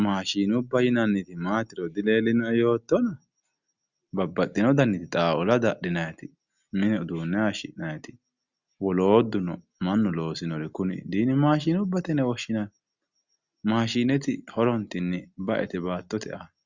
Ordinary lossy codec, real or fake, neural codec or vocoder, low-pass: Opus, 64 kbps; real; none; 7.2 kHz